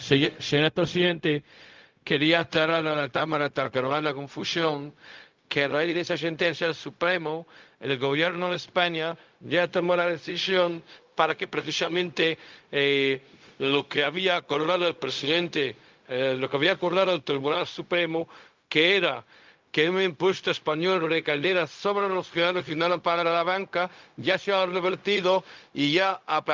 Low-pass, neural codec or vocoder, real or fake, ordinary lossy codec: 7.2 kHz; codec, 16 kHz, 0.4 kbps, LongCat-Audio-Codec; fake; Opus, 24 kbps